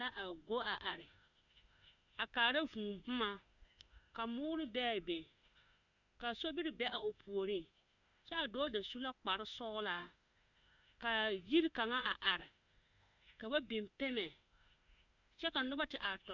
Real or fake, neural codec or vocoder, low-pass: fake; autoencoder, 48 kHz, 32 numbers a frame, DAC-VAE, trained on Japanese speech; 7.2 kHz